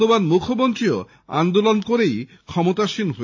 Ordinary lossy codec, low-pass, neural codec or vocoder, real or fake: AAC, 48 kbps; 7.2 kHz; none; real